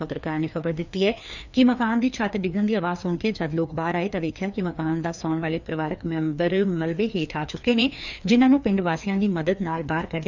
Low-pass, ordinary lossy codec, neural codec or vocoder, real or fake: 7.2 kHz; none; codec, 16 kHz, 2 kbps, FreqCodec, larger model; fake